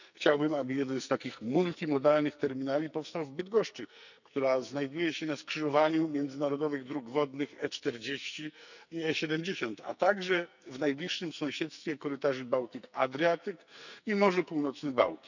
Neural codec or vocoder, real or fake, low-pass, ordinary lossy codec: codec, 44.1 kHz, 2.6 kbps, SNAC; fake; 7.2 kHz; none